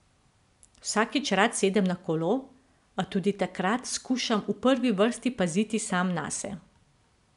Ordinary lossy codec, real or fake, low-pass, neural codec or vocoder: MP3, 96 kbps; real; 10.8 kHz; none